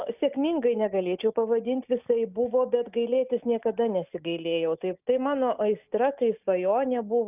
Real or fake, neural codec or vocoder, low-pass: real; none; 3.6 kHz